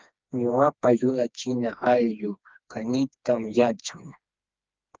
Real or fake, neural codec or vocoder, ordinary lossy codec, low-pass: fake; codec, 16 kHz, 2 kbps, FreqCodec, smaller model; Opus, 24 kbps; 7.2 kHz